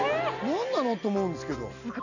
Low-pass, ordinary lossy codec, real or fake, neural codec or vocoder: 7.2 kHz; none; real; none